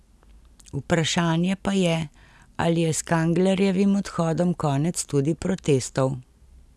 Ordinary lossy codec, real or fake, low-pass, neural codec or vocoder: none; real; none; none